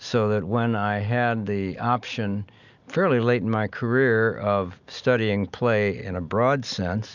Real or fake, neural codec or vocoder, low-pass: real; none; 7.2 kHz